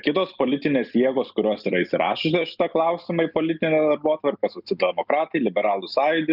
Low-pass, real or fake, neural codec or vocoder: 5.4 kHz; real; none